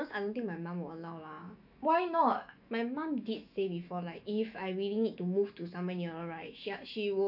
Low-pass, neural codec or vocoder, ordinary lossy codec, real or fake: 5.4 kHz; autoencoder, 48 kHz, 128 numbers a frame, DAC-VAE, trained on Japanese speech; none; fake